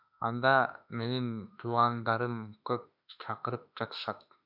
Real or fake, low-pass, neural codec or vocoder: fake; 5.4 kHz; autoencoder, 48 kHz, 32 numbers a frame, DAC-VAE, trained on Japanese speech